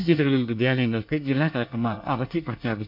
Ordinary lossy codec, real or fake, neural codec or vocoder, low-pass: none; fake; codec, 24 kHz, 1 kbps, SNAC; 5.4 kHz